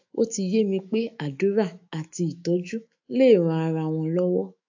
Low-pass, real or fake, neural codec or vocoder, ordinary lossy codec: 7.2 kHz; fake; autoencoder, 48 kHz, 128 numbers a frame, DAC-VAE, trained on Japanese speech; AAC, 48 kbps